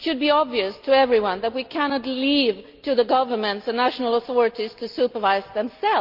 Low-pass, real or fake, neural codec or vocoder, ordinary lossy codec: 5.4 kHz; real; none; Opus, 24 kbps